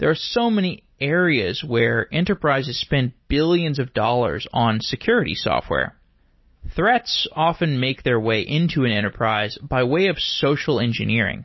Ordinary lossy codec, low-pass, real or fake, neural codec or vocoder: MP3, 24 kbps; 7.2 kHz; real; none